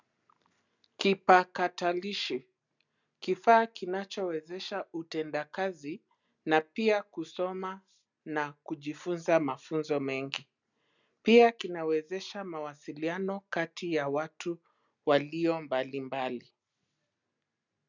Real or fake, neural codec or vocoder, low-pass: real; none; 7.2 kHz